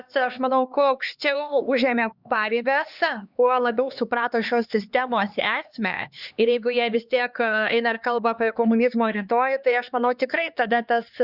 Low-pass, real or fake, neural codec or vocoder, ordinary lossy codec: 5.4 kHz; fake; codec, 16 kHz, 2 kbps, X-Codec, HuBERT features, trained on LibriSpeech; Opus, 64 kbps